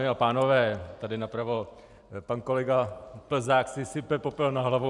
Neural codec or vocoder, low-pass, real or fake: none; 10.8 kHz; real